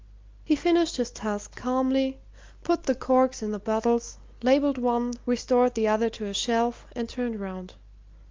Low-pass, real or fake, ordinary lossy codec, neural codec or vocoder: 7.2 kHz; real; Opus, 32 kbps; none